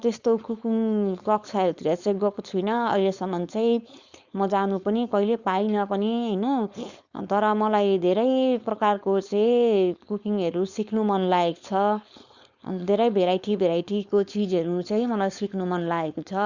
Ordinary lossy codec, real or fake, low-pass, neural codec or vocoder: Opus, 64 kbps; fake; 7.2 kHz; codec, 16 kHz, 4.8 kbps, FACodec